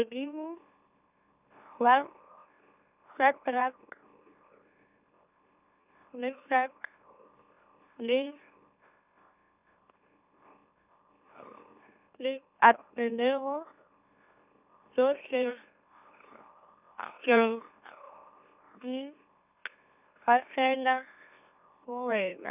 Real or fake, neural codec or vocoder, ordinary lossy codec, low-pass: fake; autoencoder, 44.1 kHz, a latent of 192 numbers a frame, MeloTTS; none; 3.6 kHz